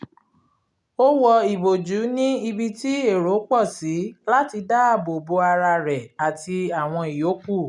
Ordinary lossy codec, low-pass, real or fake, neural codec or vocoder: none; 10.8 kHz; real; none